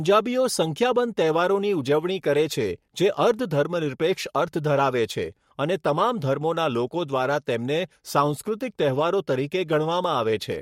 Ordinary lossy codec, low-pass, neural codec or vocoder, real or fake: MP3, 64 kbps; 19.8 kHz; codec, 44.1 kHz, 7.8 kbps, Pupu-Codec; fake